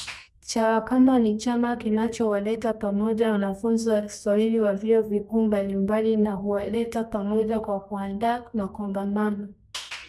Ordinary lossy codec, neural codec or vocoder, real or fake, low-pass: none; codec, 24 kHz, 0.9 kbps, WavTokenizer, medium music audio release; fake; none